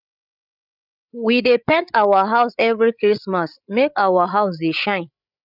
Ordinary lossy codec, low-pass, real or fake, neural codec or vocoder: none; 5.4 kHz; fake; codec, 16 kHz, 8 kbps, FreqCodec, larger model